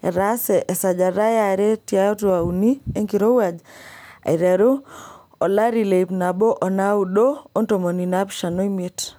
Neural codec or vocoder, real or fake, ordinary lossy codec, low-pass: none; real; none; none